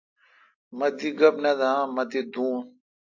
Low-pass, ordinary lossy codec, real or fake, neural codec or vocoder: 7.2 kHz; AAC, 48 kbps; real; none